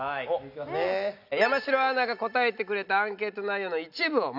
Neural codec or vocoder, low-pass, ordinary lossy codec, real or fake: none; 5.4 kHz; none; real